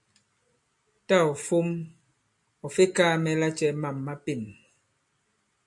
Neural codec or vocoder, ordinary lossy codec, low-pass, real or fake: none; MP3, 64 kbps; 10.8 kHz; real